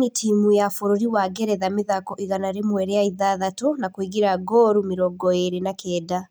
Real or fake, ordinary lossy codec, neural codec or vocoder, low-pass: real; none; none; none